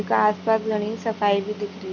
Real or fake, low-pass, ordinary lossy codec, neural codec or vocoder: real; 7.2 kHz; none; none